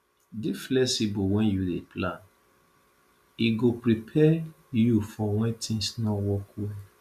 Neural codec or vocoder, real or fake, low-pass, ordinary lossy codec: none; real; 14.4 kHz; none